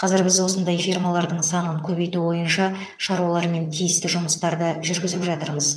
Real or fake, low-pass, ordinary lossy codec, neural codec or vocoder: fake; none; none; vocoder, 22.05 kHz, 80 mel bands, HiFi-GAN